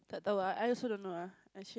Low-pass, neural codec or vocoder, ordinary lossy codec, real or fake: none; none; none; real